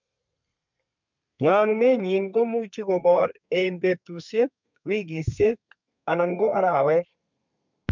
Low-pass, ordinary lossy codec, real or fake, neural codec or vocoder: 7.2 kHz; MP3, 64 kbps; fake; codec, 32 kHz, 1.9 kbps, SNAC